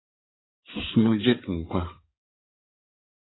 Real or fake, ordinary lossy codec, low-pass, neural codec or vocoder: fake; AAC, 16 kbps; 7.2 kHz; codec, 16 kHz in and 24 kHz out, 2.2 kbps, FireRedTTS-2 codec